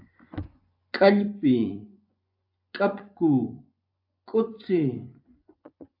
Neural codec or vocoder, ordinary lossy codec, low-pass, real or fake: vocoder, 24 kHz, 100 mel bands, Vocos; AAC, 48 kbps; 5.4 kHz; fake